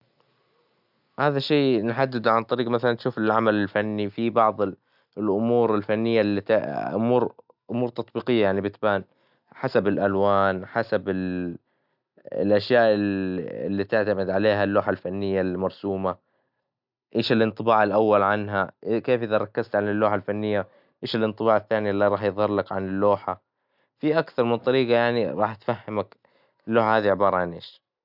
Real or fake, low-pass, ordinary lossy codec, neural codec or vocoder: real; 5.4 kHz; none; none